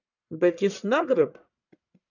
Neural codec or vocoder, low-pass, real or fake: codec, 44.1 kHz, 1.7 kbps, Pupu-Codec; 7.2 kHz; fake